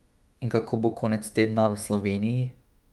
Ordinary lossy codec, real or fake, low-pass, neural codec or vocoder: Opus, 32 kbps; fake; 19.8 kHz; autoencoder, 48 kHz, 32 numbers a frame, DAC-VAE, trained on Japanese speech